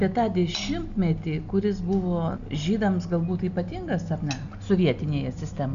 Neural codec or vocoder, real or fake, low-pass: none; real; 7.2 kHz